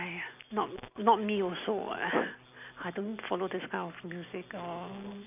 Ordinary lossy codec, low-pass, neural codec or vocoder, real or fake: none; 3.6 kHz; none; real